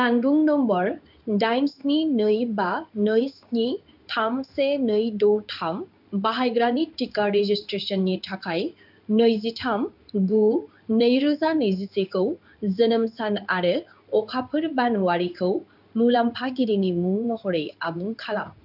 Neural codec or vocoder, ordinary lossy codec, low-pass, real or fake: codec, 16 kHz in and 24 kHz out, 1 kbps, XY-Tokenizer; none; 5.4 kHz; fake